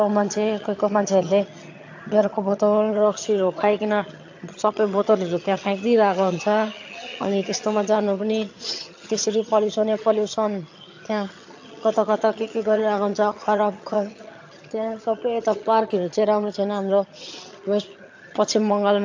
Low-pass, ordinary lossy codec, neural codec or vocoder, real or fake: 7.2 kHz; AAC, 48 kbps; vocoder, 22.05 kHz, 80 mel bands, HiFi-GAN; fake